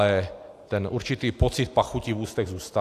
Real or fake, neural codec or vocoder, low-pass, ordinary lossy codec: real; none; 14.4 kHz; AAC, 64 kbps